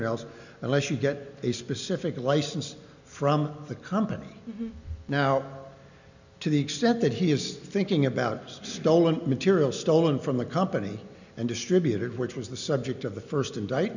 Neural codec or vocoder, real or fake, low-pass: none; real; 7.2 kHz